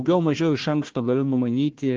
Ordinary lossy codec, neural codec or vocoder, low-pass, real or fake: Opus, 16 kbps; codec, 16 kHz, 1 kbps, FunCodec, trained on Chinese and English, 50 frames a second; 7.2 kHz; fake